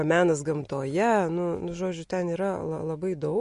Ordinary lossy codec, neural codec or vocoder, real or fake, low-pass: MP3, 48 kbps; none; real; 10.8 kHz